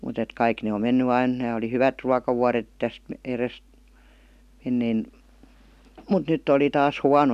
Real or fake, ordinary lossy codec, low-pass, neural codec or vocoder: real; none; 14.4 kHz; none